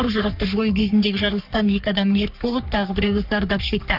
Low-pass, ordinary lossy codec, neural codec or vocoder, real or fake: 5.4 kHz; none; codec, 44.1 kHz, 3.4 kbps, Pupu-Codec; fake